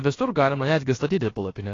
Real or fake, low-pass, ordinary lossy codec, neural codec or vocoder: fake; 7.2 kHz; AAC, 32 kbps; codec, 16 kHz, about 1 kbps, DyCAST, with the encoder's durations